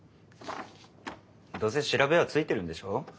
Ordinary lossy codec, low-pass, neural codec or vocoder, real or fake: none; none; none; real